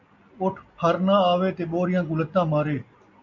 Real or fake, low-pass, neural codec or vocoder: real; 7.2 kHz; none